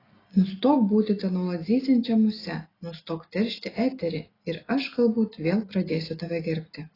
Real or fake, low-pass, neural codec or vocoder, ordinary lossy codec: real; 5.4 kHz; none; AAC, 24 kbps